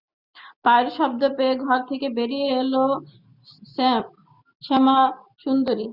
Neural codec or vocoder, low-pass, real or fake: none; 5.4 kHz; real